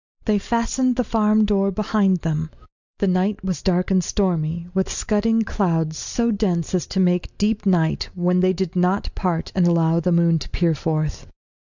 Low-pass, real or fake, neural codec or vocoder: 7.2 kHz; real; none